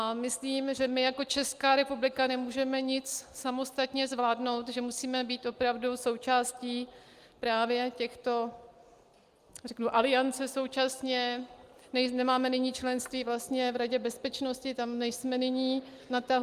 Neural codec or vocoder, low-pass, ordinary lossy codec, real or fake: none; 14.4 kHz; Opus, 32 kbps; real